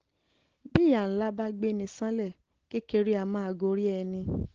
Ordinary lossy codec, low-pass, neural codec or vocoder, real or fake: Opus, 16 kbps; 7.2 kHz; none; real